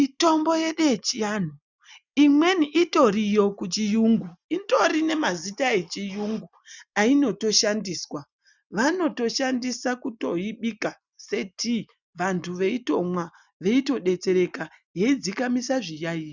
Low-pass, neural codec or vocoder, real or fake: 7.2 kHz; none; real